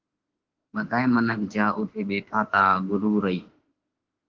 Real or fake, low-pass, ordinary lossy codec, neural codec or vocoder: fake; 7.2 kHz; Opus, 16 kbps; autoencoder, 48 kHz, 32 numbers a frame, DAC-VAE, trained on Japanese speech